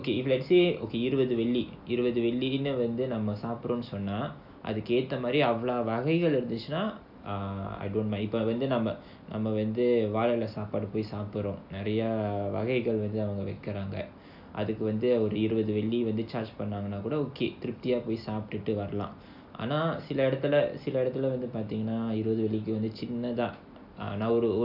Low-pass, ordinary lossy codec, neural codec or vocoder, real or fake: 5.4 kHz; AAC, 48 kbps; none; real